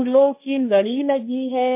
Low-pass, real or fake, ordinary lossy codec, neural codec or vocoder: 3.6 kHz; fake; MP3, 24 kbps; codec, 16 kHz, 1.1 kbps, Voila-Tokenizer